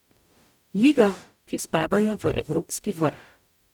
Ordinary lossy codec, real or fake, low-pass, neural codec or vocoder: none; fake; 19.8 kHz; codec, 44.1 kHz, 0.9 kbps, DAC